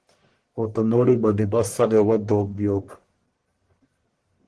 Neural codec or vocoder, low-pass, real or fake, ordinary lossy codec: codec, 44.1 kHz, 1.7 kbps, Pupu-Codec; 10.8 kHz; fake; Opus, 16 kbps